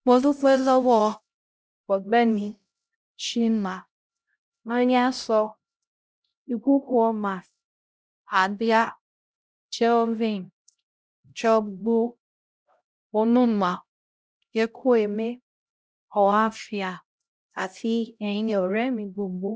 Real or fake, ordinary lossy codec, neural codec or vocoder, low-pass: fake; none; codec, 16 kHz, 0.5 kbps, X-Codec, HuBERT features, trained on LibriSpeech; none